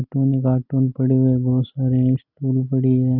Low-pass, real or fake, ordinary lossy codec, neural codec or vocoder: 5.4 kHz; real; none; none